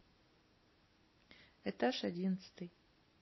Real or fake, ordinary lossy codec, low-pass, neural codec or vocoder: real; MP3, 24 kbps; 7.2 kHz; none